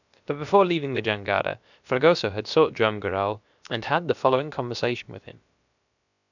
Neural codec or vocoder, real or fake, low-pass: codec, 16 kHz, about 1 kbps, DyCAST, with the encoder's durations; fake; 7.2 kHz